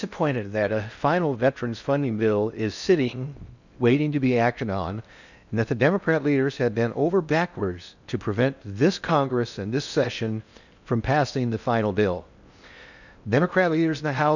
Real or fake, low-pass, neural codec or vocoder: fake; 7.2 kHz; codec, 16 kHz in and 24 kHz out, 0.6 kbps, FocalCodec, streaming, 4096 codes